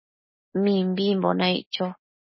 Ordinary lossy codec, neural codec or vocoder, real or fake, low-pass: MP3, 24 kbps; none; real; 7.2 kHz